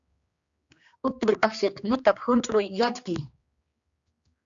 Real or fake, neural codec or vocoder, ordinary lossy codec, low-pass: fake; codec, 16 kHz, 2 kbps, X-Codec, HuBERT features, trained on general audio; Opus, 64 kbps; 7.2 kHz